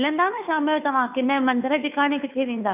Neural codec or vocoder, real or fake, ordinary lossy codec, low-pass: codec, 16 kHz, 2 kbps, FunCodec, trained on Chinese and English, 25 frames a second; fake; none; 3.6 kHz